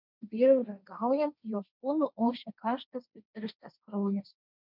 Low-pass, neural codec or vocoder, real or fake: 5.4 kHz; codec, 16 kHz, 1.1 kbps, Voila-Tokenizer; fake